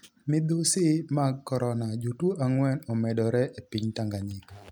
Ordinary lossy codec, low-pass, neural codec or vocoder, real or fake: none; none; none; real